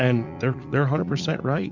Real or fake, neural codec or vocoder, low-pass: fake; vocoder, 44.1 kHz, 80 mel bands, Vocos; 7.2 kHz